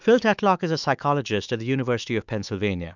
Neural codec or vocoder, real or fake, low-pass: autoencoder, 48 kHz, 128 numbers a frame, DAC-VAE, trained on Japanese speech; fake; 7.2 kHz